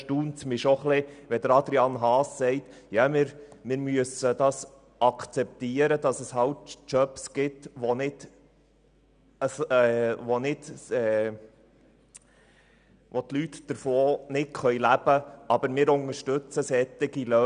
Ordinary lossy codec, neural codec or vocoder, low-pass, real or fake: none; none; 9.9 kHz; real